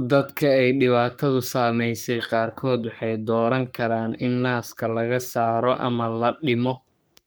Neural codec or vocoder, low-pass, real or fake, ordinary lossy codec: codec, 44.1 kHz, 3.4 kbps, Pupu-Codec; none; fake; none